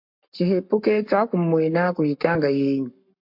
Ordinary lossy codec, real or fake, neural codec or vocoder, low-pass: MP3, 48 kbps; fake; codec, 44.1 kHz, 7.8 kbps, Pupu-Codec; 5.4 kHz